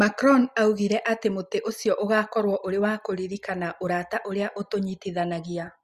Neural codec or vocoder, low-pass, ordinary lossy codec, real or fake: none; 14.4 kHz; Opus, 64 kbps; real